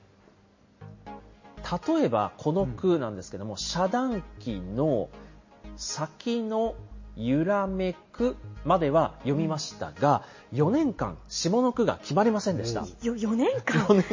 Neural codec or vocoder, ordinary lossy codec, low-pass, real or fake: none; MP3, 32 kbps; 7.2 kHz; real